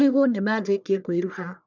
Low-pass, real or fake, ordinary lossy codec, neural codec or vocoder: 7.2 kHz; fake; none; codec, 44.1 kHz, 1.7 kbps, Pupu-Codec